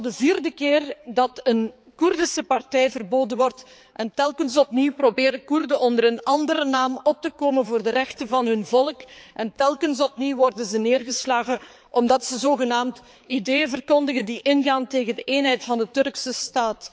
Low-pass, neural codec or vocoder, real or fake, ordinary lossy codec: none; codec, 16 kHz, 4 kbps, X-Codec, HuBERT features, trained on balanced general audio; fake; none